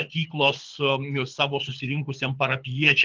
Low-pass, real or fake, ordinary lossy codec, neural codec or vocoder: 7.2 kHz; fake; Opus, 24 kbps; codec, 24 kHz, 6 kbps, HILCodec